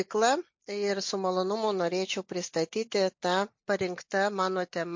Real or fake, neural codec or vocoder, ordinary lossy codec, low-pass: real; none; MP3, 48 kbps; 7.2 kHz